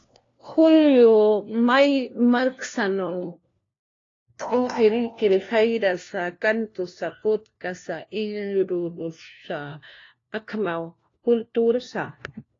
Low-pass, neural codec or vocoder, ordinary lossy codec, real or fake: 7.2 kHz; codec, 16 kHz, 1 kbps, FunCodec, trained on LibriTTS, 50 frames a second; AAC, 32 kbps; fake